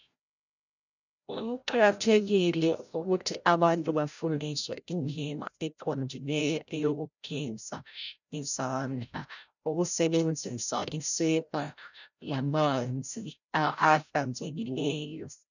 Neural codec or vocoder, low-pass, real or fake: codec, 16 kHz, 0.5 kbps, FreqCodec, larger model; 7.2 kHz; fake